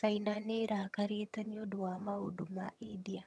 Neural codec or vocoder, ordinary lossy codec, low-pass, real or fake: vocoder, 22.05 kHz, 80 mel bands, HiFi-GAN; none; none; fake